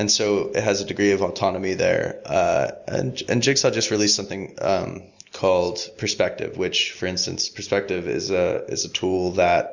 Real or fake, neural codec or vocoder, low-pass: real; none; 7.2 kHz